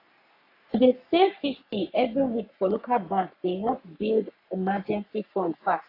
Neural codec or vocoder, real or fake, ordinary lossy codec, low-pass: codec, 44.1 kHz, 3.4 kbps, Pupu-Codec; fake; AAC, 32 kbps; 5.4 kHz